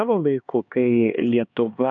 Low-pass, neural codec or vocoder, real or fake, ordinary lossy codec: 7.2 kHz; codec, 16 kHz, 2 kbps, X-Codec, HuBERT features, trained on LibriSpeech; fake; AAC, 64 kbps